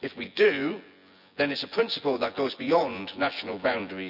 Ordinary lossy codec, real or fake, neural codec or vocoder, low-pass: none; fake; vocoder, 24 kHz, 100 mel bands, Vocos; 5.4 kHz